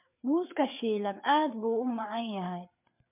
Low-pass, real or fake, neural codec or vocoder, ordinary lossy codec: 3.6 kHz; fake; codec, 16 kHz, 4 kbps, FreqCodec, larger model; MP3, 32 kbps